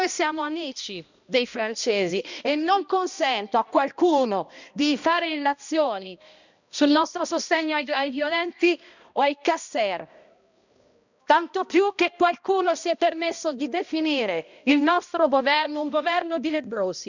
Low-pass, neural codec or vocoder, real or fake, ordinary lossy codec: 7.2 kHz; codec, 16 kHz, 1 kbps, X-Codec, HuBERT features, trained on balanced general audio; fake; none